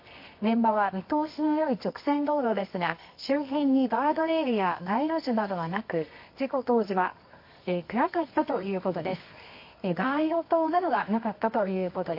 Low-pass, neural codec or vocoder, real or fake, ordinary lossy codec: 5.4 kHz; codec, 24 kHz, 0.9 kbps, WavTokenizer, medium music audio release; fake; MP3, 32 kbps